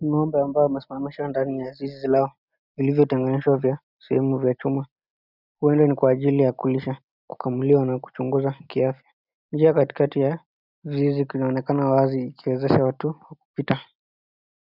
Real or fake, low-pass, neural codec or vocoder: real; 5.4 kHz; none